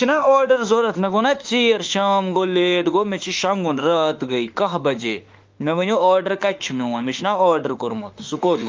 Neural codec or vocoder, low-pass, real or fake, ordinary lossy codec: autoencoder, 48 kHz, 32 numbers a frame, DAC-VAE, trained on Japanese speech; 7.2 kHz; fake; Opus, 24 kbps